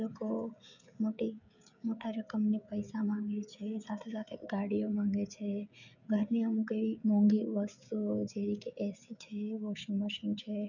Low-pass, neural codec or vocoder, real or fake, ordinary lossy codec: 7.2 kHz; codec, 16 kHz, 16 kbps, FreqCodec, smaller model; fake; none